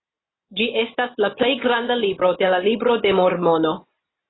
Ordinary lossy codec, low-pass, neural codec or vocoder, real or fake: AAC, 16 kbps; 7.2 kHz; none; real